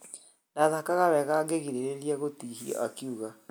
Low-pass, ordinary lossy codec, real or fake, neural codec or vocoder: none; none; real; none